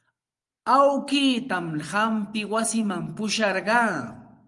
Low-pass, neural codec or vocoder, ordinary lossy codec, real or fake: 10.8 kHz; vocoder, 24 kHz, 100 mel bands, Vocos; Opus, 32 kbps; fake